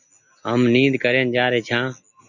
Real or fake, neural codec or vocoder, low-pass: real; none; 7.2 kHz